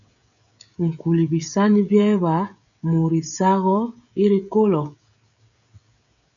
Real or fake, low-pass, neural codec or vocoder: fake; 7.2 kHz; codec, 16 kHz, 16 kbps, FreqCodec, smaller model